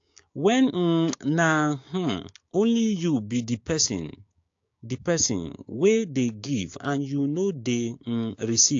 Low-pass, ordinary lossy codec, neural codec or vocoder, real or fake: 7.2 kHz; AAC, 48 kbps; codec, 16 kHz, 6 kbps, DAC; fake